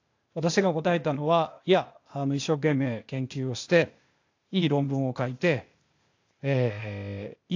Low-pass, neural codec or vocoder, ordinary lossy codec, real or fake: 7.2 kHz; codec, 16 kHz, 0.8 kbps, ZipCodec; AAC, 48 kbps; fake